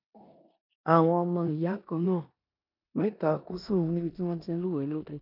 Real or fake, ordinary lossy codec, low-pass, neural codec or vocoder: fake; none; 5.4 kHz; codec, 16 kHz in and 24 kHz out, 0.9 kbps, LongCat-Audio-Codec, four codebook decoder